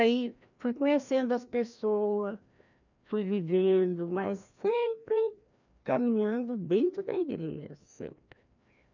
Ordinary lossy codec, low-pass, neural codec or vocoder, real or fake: none; 7.2 kHz; codec, 16 kHz, 1 kbps, FreqCodec, larger model; fake